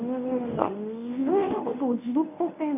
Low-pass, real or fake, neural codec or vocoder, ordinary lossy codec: 3.6 kHz; fake; codec, 24 kHz, 0.9 kbps, WavTokenizer, medium speech release version 1; none